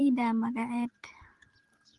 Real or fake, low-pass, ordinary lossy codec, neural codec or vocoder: fake; 10.8 kHz; Opus, 24 kbps; vocoder, 44.1 kHz, 128 mel bands every 512 samples, BigVGAN v2